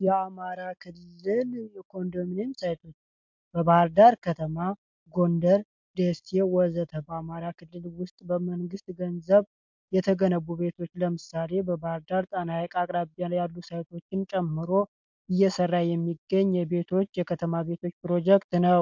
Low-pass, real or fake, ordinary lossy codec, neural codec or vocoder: 7.2 kHz; real; MP3, 64 kbps; none